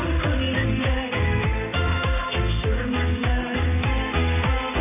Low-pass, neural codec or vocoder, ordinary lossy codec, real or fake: 3.6 kHz; codec, 16 kHz in and 24 kHz out, 1 kbps, XY-Tokenizer; none; fake